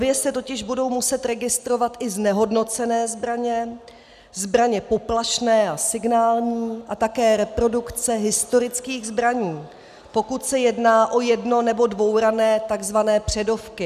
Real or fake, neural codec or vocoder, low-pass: real; none; 14.4 kHz